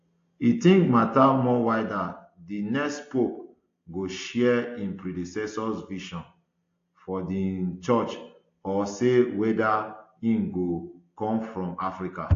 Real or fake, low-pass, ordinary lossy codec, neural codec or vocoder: real; 7.2 kHz; AAC, 48 kbps; none